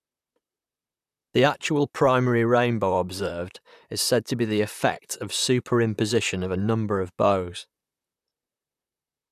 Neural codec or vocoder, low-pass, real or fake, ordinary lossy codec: vocoder, 44.1 kHz, 128 mel bands, Pupu-Vocoder; 14.4 kHz; fake; none